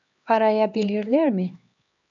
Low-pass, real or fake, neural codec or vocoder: 7.2 kHz; fake; codec, 16 kHz, 2 kbps, X-Codec, HuBERT features, trained on LibriSpeech